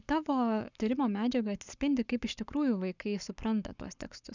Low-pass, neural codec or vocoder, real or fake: 7.2 kHz; codec, 44.1 kHz, 7.8 kbps, Pupu-Codec; fake